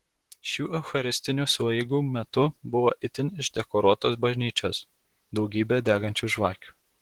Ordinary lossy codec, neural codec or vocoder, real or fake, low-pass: Opus, 16 kbps; vocoder, 44.1 kHz, 128 mel bands, Pupu-Vocoder; fake; 19.8 kHz